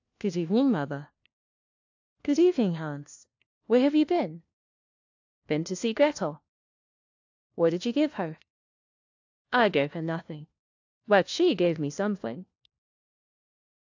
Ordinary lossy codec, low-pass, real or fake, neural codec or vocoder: AAC, 48 kbps; 7.2 kHz; fake; codec, 16 kHz, 1 kbps, FunCodec, trained on LibriTTS, 50 frames a second